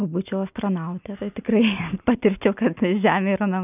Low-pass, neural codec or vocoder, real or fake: 3.6 kHz; none; real